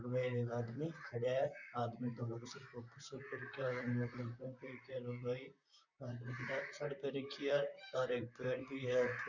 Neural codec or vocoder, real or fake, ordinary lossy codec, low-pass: vocoder, 44.1 kHz, 128 mel bands, Pupu-Vocoder; fake; none; 7.2 kHz